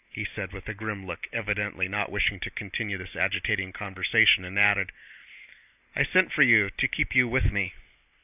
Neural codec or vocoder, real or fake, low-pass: none; real; 3.6 kHz